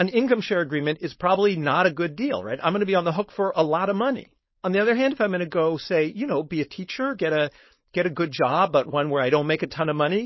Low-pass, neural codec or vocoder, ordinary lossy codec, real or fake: 7.2 kHz; codec, 16 kHz, 4.8 kbps, FACodec; MP3, 24 kbps; fake